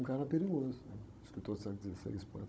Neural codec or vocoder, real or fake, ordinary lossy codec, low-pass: codec, 16 kHz, 16 kbps, FunCodec, trained on Chinese and English, 50 frames a second; fake; none; none